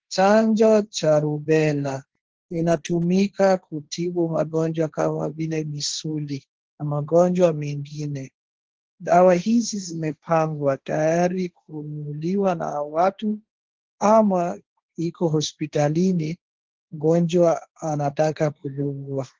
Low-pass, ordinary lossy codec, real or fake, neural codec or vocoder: 7.2 kHz; Opus, 16 kbps; fake; codec, 16 kHz, 1.1 kbps, Voila-Tokenizer